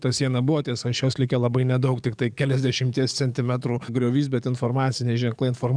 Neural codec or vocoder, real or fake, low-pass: codec, 44.1 kHz, 7.8 kbps, DAC; fake; 9.9 kHz